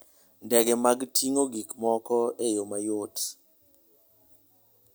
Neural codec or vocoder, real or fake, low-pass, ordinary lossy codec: none; real; none; none